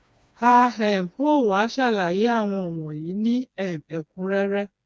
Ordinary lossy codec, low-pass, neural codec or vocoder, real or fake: none; none; codec, 16 kHz, 2 kbps, FreqCodec, smaller model; fake